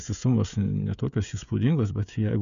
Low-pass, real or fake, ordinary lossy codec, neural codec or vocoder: 7.2 kHz; fake; MP3, 96 kbps; codec, 16 kHz, 16 kbps, FreqCodec, smaller model